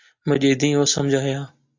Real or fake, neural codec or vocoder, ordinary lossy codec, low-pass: real; none; AAC, 48 kbps; 7.2 kHz